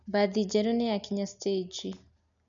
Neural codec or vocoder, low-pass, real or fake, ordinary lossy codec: none; 7.2 kHz; real; none